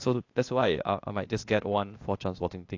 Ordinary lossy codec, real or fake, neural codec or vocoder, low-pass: AAC, 48 kbps; fake; codec, 16 kHz, 0.8 kbps, ZipCodec; 7.2 kHz